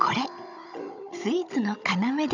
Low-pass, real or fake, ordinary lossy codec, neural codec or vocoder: 7.2 kHz; fake; none; codec, 16 kHz, 16 kbps, FunCodec, trained on Chinese and English, 50 frames a second